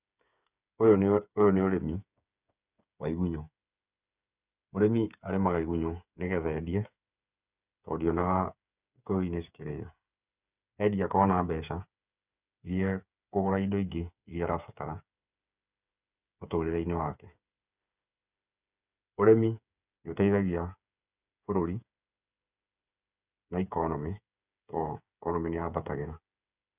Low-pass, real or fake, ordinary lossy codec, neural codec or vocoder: 3.6 kHz; fake; none; codec, 16 kHz, 8 kbps, FreqCodec, smaller model